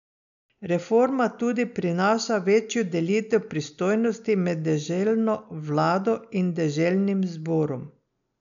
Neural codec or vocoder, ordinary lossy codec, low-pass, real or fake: none; none; 7.2 kHz; real